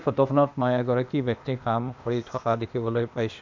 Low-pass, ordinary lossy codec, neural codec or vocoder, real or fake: 7.2 kHz; MP3, 64 kbps; codec, 16 kHz, 0.8 kbps, ZipCodec; fake